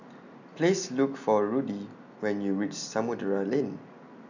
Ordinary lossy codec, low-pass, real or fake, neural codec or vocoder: none; 7.2 kHz; real; none